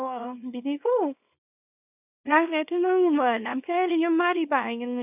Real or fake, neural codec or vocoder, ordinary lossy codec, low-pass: fake; codec, 24 kHz, 0.9 kbps, WavTokenizer, small release; none; 3.6 kHz